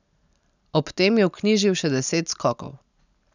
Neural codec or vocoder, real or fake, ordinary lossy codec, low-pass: none; real; none; 7.2 kHz